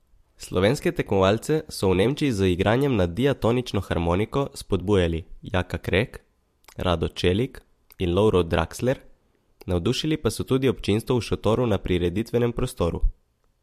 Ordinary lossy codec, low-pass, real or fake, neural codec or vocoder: MP3, 64 kbps; 14.4 kHz; fake; vocoder, 44.1 kHz, 128 mel bands every 256 samples, BigVGAN v2